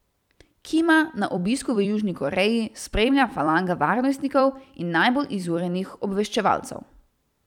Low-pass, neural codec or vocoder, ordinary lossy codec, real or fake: 19.8 kHz; vocoder, 44.1 kHz, 128 mel bands every 256 samples, BigVGAN v2; none; fake